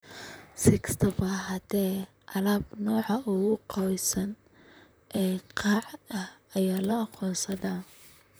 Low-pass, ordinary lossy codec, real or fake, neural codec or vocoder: none; none; fake; vocoder, 44.1 kHz, 128 mel bands, Pupu-Vocoder